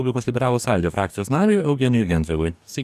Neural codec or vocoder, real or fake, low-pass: codec, 44.1 kHz, 2.6 kbps, DAC; fake; 14.4 kHz